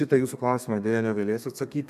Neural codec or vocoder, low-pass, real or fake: codec, 32 kHz, 1.9 kbps, SNAC; 14.4 kHz; fake